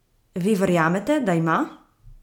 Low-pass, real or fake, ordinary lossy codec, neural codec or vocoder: 19.8 kHz; real; MP3, 96 kbps; none